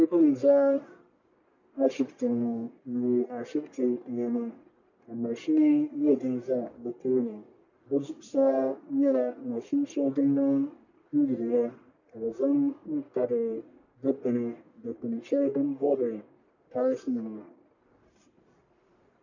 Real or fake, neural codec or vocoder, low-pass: fake; codec, 44.1 kHz, 1.7 kbps, Pupu-Codec; 7.2 kHz